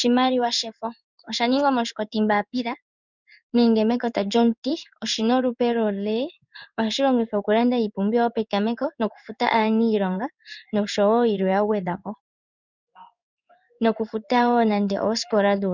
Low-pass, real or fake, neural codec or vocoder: 7.2 kHz; fake; codec, 16 kHz in and 24 kHz out, 1 kbps, XY-Tokenizer